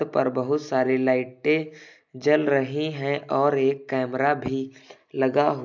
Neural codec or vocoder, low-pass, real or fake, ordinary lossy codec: none; 7.2 kHz; real; none